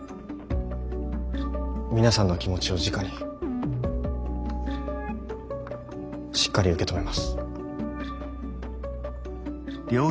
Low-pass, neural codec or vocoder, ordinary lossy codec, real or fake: none; none; none; real